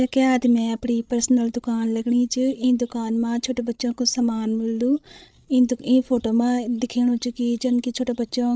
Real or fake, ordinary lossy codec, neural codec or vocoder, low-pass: fake; none; codec, 16 kHz, 16 kbps, FreqCodec, larger model; none